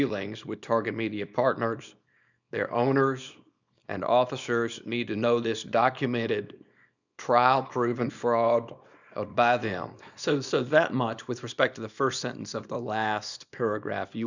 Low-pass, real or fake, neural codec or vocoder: 7.2 kHz; fake; codec, 24 kHz, 0.9 kbps, WavTokenizer, small release